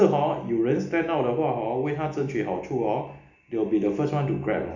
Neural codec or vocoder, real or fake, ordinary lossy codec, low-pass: none; real; none; 7.2 kHz